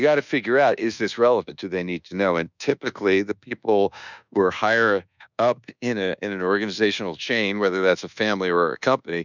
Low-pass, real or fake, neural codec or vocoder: 7.2 kHz; fake; codec, 24 kHz, 1.2 kbps, DualCodec